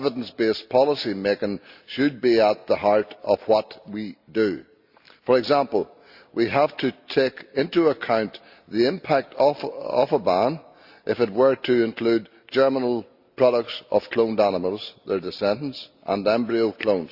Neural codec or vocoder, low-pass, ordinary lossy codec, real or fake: none; 5.4 kHz; Opus, 64 kbps; real